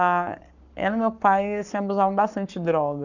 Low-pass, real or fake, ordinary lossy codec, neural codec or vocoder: 7.2 kHz; fake; none; codec, 44.1 kHz, 7.8 kbps, Pupu-Codec